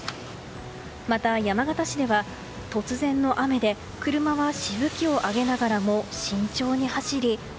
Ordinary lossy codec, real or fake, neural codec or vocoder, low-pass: none; real; none; none